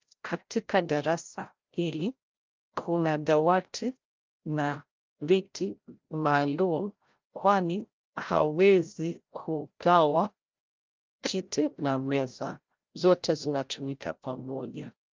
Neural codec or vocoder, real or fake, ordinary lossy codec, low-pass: codec, 16 kHz, 0.5 kbps, FreqCodec, larger model; fake; Opus, 24 kbps; 7.2 kHz